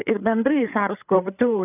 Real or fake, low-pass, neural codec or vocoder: fake; 3.6 kHz; codec, 16 kHz, 8 kbps, FreqCodec, larger model